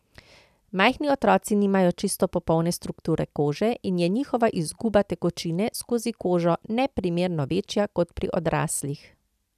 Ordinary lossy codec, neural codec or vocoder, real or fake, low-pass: none; none; real; 14.4 kHz